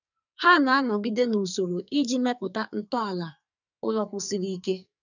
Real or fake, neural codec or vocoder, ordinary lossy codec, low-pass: fake; codec, 44.1 kHz, 2.6 kbps, SNAC; none; 7.2 kHz